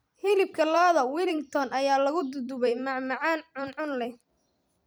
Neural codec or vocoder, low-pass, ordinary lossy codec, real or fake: vocoder, 44.1 kHz, 128 mel bands every 256 samples, BigVGAN v2; none; none; fake